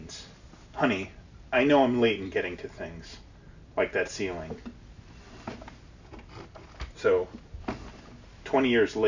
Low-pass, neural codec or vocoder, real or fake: 7.2 kHz; none; real